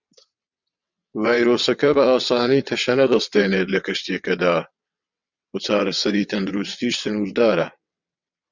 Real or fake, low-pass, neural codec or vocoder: fake; 7.2 kHz; vocoder, 22.05 kHz, 80 mel bands, WaveNeXt